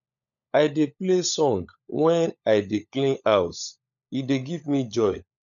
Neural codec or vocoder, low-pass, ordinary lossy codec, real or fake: codec, 16 kHz, 16 kbps, FunCodec, trained on LibriTTS, 50 frames a second; 7.2 kHz; none; fake